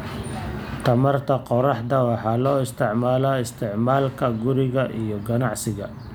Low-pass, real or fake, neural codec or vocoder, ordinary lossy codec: none; real; none; none